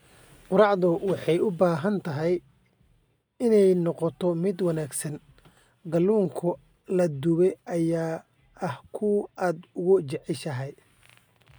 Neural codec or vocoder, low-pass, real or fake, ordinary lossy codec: none; none; real; none